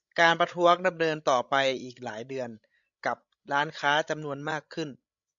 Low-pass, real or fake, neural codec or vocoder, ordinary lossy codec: 7.2 kHz; fake; codec, 16 kHz, 16 kbps, FreqCodec, larger model; MP3, 64 kbps